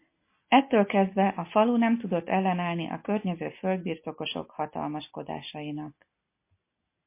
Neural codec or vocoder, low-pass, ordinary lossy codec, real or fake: none; 3.6 kHz; MP3, 24 kbps; real